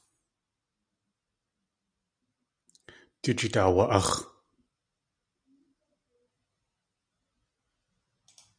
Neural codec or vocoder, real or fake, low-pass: none; real; 9.9 kHz